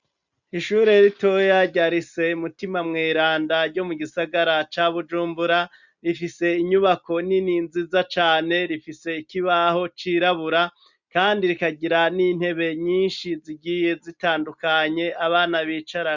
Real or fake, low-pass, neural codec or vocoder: real; 7.2 kHz; none